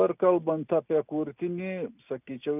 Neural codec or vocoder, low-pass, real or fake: none; 3.6 kHz; real